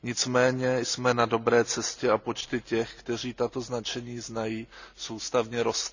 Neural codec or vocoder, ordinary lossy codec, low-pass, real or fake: none; none; 7.2 kHz; real